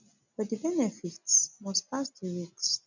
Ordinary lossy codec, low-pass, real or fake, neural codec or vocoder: none; 7.2 kHz; real; none